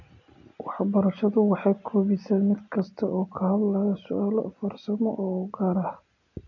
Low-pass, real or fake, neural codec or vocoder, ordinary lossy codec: 7.2 kHz; real; none; none